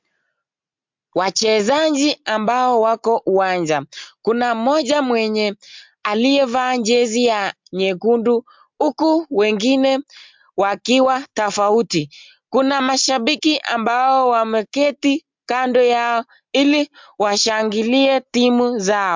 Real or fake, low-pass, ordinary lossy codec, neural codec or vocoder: real; 7.2 kHz; MP3, 64 kbps; none